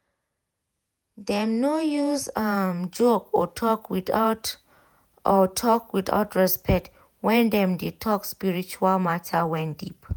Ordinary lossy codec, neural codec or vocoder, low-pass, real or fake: none; vocoder, 48 kHz, 128 mel bands, Vocos; none; fake